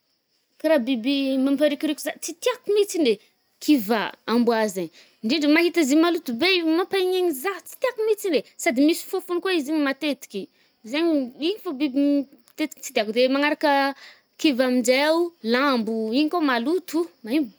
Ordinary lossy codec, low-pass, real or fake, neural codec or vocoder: none; none; real; none